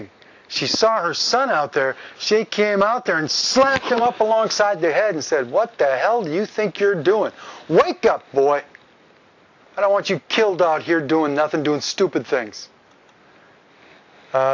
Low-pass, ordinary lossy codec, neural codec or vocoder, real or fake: 7.2 kHz; AAC, 48 kbps; none; real